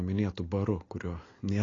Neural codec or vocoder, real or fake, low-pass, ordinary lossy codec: none; real; 7.2 kHz; AAC, 32 kbps